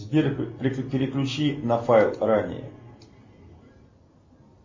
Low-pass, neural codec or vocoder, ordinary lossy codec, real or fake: 7.2 kHz; none; MP3, 32 kbps; real